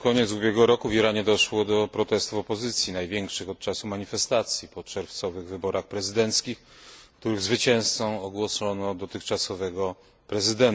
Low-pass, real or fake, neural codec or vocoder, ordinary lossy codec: none; real; none; none